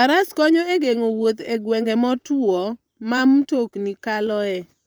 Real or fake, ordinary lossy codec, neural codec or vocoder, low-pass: fake; none; vocoder, 44.1 kHz, 128 mel bands, Pupu-Vocoder; none